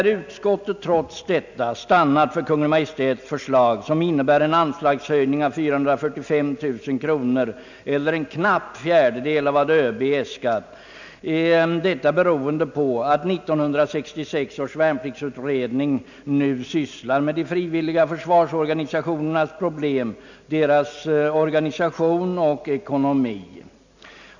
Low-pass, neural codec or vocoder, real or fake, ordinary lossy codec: 7.2 kHz; none; real; none